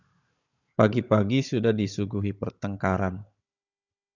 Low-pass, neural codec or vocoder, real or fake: 7.2 kHz; codec, 16 kHz, 16 kbps, FunCodec, trained on Chinese and English, 50 frames a second; fake